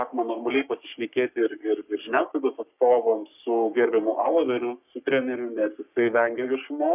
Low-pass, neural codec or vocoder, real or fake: 3.6 kHz; codec, 44.1 kHz, 3.4 kbps, Pupu-Codec; fake